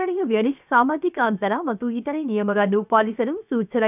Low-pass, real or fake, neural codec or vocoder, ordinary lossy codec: 3.6 kHz; fake; codec, 16 kHz, 0.7 kbps, FocalCodec; none